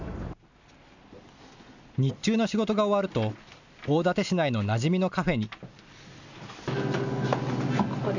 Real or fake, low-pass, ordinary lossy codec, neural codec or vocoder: real; 7.2 kHz; none; none